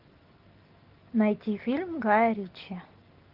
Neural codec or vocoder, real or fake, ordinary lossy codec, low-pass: none; real; Opus, 24 kbps; 5.4 kHz